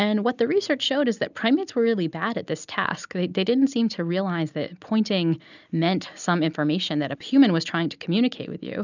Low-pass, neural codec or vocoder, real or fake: 7.2 kHz; none; real